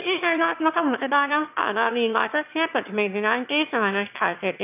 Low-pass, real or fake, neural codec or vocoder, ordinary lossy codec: 3.6 kHz; fake; autoencoder, 22.05 kHz, a latent of 192 numbers a frame, VITS, trained on one speaker; none